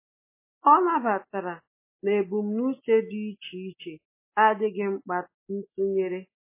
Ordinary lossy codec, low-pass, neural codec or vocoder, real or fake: MP3, 16 kbps; 3.6 kHz; none; real